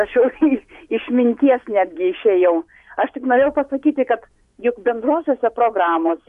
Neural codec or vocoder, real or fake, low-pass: vocoder, 24 kHz, 100 mel bands, Vocos; fake; 10.8 kHz